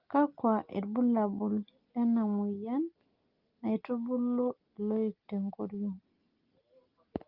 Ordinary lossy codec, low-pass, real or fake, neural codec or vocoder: Opus, 32 kbps; 5.4 kHz; real; none